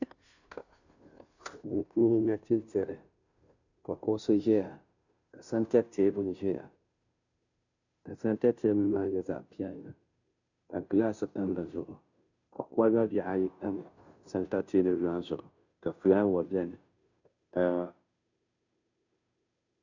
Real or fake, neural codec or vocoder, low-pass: fake; codec, 16 kHz, 0.5 kbps, FunCodec, trained on Chinese and English, 25 frames a second; 7.2 kHz